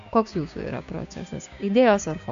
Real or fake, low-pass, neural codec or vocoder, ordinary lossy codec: fake; 7.2 kHz; codec, 16 kHz, 6 kbps, DAC; AAC, 64 kbps